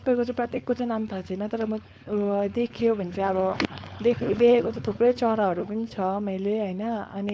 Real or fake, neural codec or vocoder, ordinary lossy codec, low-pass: fake; codec, 16 kHz, 4.8 kbps, FACodec; none; none